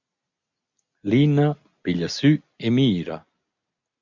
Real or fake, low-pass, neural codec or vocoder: real; 7.2 kHz; none